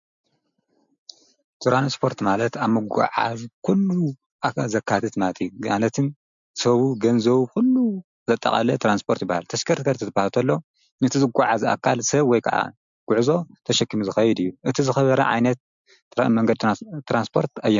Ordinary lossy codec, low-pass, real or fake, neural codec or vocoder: MP3, 64 kbps; 7.2 kHz; real; none